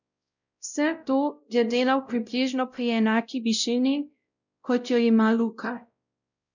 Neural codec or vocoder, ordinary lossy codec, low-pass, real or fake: codec, 16 kHz, 0.5 kbps, X-Codec, WavLM features, trained on Multilingual LibriSpeech; none; 7.2 kHz; fake